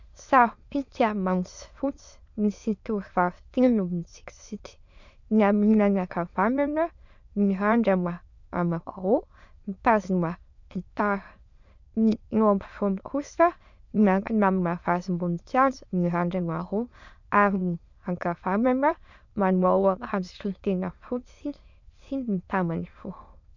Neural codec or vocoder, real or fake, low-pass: autoencoder, 22.05 kHz, a latent of 192 numbers a frame, VITS, trained on many speakers; fake; 7.2 kHz